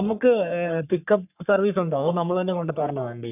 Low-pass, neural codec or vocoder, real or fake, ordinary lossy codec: 3.6 kHz; codec, 44.1 kHz, 3.4 kbps, Pupu-Codec; fake; none